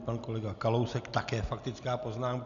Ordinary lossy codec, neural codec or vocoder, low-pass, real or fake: MP3, 96 kbps; none; 7.2 kHz; real